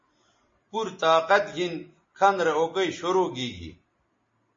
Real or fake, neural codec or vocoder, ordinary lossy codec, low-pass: real; none; MP3, 32 kbps; 7.2 kHz